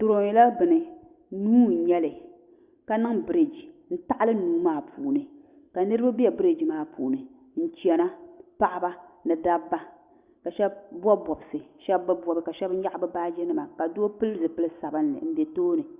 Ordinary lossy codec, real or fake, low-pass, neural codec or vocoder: Opus, 64 kbps; real; 3.6 kHz; none